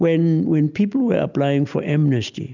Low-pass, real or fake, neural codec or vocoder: 7.2 kHz; real; none